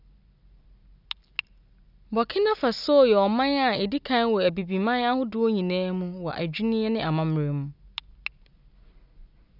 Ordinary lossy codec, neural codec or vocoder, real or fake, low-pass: none; none; real; 5.4 kHz